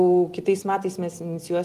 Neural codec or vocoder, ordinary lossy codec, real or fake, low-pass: none; Opus, 24 kbps; real; 14.4 kHz